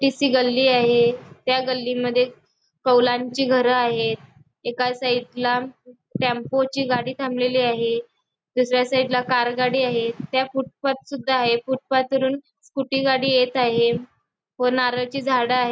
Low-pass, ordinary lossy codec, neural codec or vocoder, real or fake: none; none; none; real